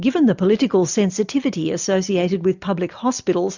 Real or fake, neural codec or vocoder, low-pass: real; none; 7.2 kHz